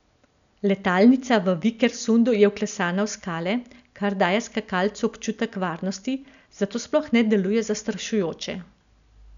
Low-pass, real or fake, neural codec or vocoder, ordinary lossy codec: 7.2 kHz; real; none; none